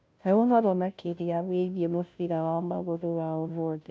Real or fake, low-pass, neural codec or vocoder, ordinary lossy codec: fake; none; codec, 16 kHz, 0.5 kbps, FunCodec, trained on Chinese and English, 25 frames a second; none